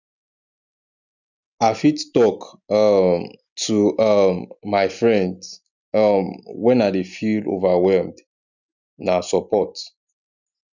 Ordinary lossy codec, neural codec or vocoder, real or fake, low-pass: none; none; real; 7.2 kHz